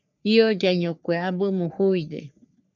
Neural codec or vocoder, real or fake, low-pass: codec, 44.1 kHz, 3.4 kbps, Pupu-Codec; fake; 7.2 kHz